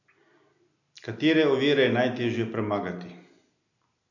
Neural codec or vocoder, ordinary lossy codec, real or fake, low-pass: none; none; real; 7.2 kHz